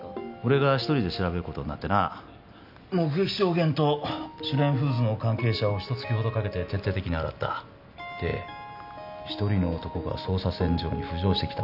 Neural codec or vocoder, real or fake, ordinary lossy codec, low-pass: none; real; none; 5.4 kHz